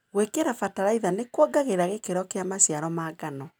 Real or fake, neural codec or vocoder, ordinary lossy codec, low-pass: real; none; none; none